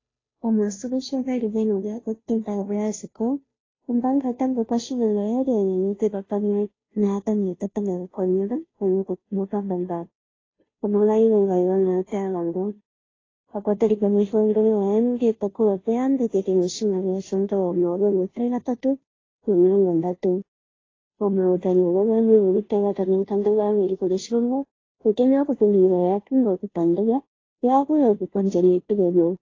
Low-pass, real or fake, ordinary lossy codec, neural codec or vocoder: 7.2 kHz; fake; AAC, 32 kbps; codec, 16 kHz, 0.5 kbps, FunCodec, trained on Chinese and English, 25 frames a second